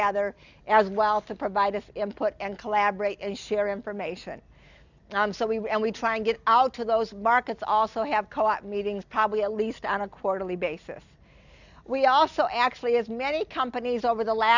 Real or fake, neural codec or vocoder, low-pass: real; none; 7.2 kHz